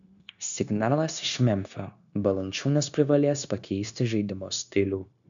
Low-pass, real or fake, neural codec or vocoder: 7.2 kHz; fake; codec, 16 kHz, 0.9 kbps, LongCat-Audio-Codec